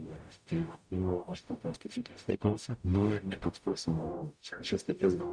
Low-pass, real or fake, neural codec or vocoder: 9.9 kHz; fake; codec, 44.1 kHz, 0.9 kbps, DAC